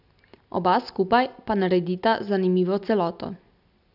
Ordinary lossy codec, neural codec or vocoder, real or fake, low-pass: none; none; real; 5.4 kHz